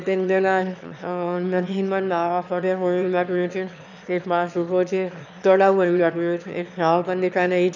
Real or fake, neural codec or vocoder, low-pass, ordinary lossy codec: fake; autoencoder, 22.05 kHz, a latent of 192 numbers a frame, VITS, trained on one speaker; 7.2 kHz; none